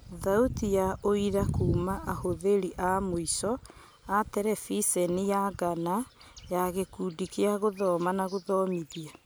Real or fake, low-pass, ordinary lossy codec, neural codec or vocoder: real; none; none; none